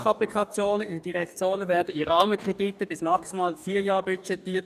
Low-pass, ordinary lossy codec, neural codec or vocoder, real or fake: 14.4 kHz; none; codec, 44.1 kHz, 2.6 kbps, DAC; fake